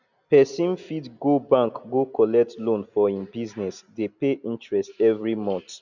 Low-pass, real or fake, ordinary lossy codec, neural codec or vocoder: 7.2 kHz; real; none; none